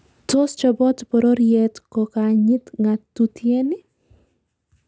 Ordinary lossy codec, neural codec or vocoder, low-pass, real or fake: none; none; none; real